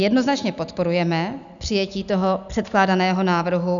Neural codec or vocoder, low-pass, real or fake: none; 7.2 kHz; real